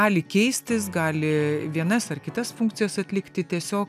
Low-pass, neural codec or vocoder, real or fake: 14.4 kHz; none; real